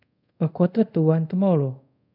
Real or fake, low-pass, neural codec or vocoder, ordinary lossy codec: fake; 5.4 kHz; codec, 24 kHz, 0.5 kbps, DualCodec; none